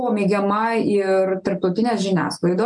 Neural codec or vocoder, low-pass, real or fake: none; 10.8 kHz; real